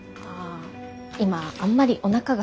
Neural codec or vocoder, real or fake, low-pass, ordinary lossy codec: none; real; none; none